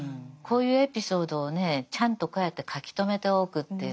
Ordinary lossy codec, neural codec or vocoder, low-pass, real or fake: none; none; none; real